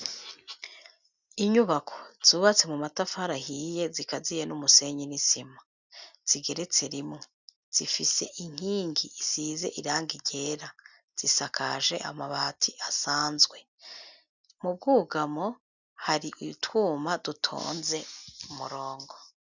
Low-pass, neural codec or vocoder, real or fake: 7.2 kHz; none; real